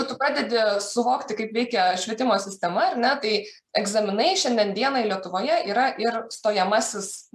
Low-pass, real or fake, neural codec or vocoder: 14.4 kHz; real; none